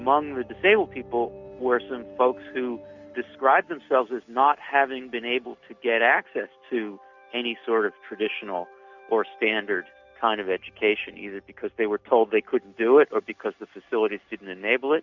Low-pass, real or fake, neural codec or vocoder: 7.2 kHz; real; none